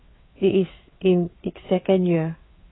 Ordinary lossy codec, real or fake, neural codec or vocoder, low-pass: AAC, 16 kbps; fake; codec, 16 kHz, 4 kbps, FreqCodec, larger model; 7.2 kHz